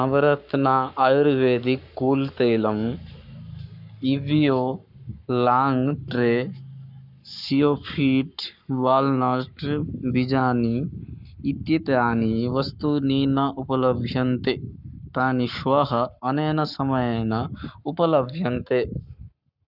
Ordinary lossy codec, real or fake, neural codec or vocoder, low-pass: none; fake; codec, 44.1 kHz, 7.8 kbps, Pupu-Codec; 5.4 kHz